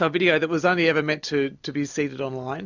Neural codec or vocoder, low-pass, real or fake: none; 7.2 kHz; real